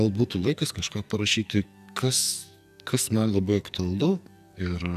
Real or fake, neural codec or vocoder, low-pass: fake; codec, 44.1 kHz, 2.6 kbps, SNAC; 14.4 kHz